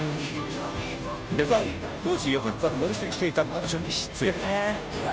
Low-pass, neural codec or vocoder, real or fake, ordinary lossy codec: none; codec, 16 kHz, 0.5 kbps, FunCodec, trained on Chinese and English, 25 frames a second; fake; none